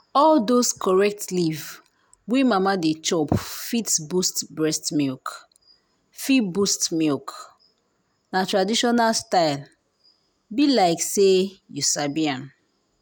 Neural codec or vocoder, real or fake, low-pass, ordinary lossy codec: none; real; none; none